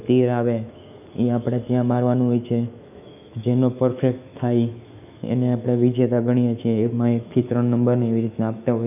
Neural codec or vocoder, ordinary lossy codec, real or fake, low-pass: autoencoder, 48 kHz, 128 numbers a frame, DAC-VAE, trained on Japanese speech; none; fake; 3.6 kHz